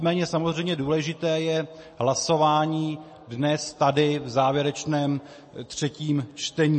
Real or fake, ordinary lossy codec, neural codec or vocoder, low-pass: real; MP3, 32 kbps; none; 10.8 kHz